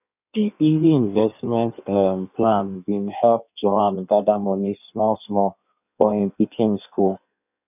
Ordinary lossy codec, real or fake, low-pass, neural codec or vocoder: none; fake; 3.6 kHz; codec, 16 kHz in and 24 kHz out, 1.1 kbps, FireRedTTS-2 codec